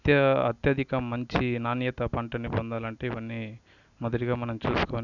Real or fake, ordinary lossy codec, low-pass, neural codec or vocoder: real; none; 7.2 kHz; none